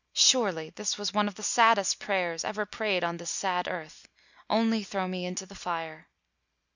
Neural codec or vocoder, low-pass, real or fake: none; 7.2 kHz; real